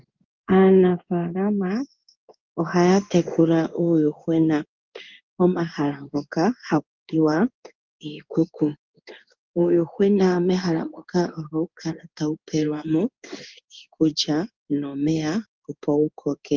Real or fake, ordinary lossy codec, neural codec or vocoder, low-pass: fake; Opus, 32 kbps; codec, 16 kHz in and 24 kHz out, 1 kbps, XY-Tokenizer; 7.2 kHz